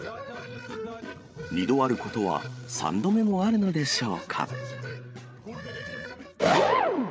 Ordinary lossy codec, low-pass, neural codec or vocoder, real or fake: none; none; codec, 16 kHz, 8 kbps, FreqCodec, larger model; fake